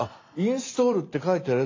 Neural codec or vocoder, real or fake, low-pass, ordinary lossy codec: none; real; 7.2 kHz; AAC, 32 kbps